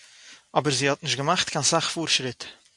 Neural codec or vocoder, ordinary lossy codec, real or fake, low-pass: none; MP3, 64 kbps; real; 10.8 kHz